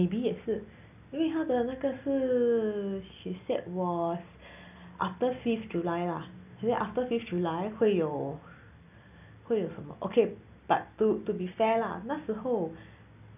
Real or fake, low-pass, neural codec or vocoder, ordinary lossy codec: real; 3.6 kHz; none; none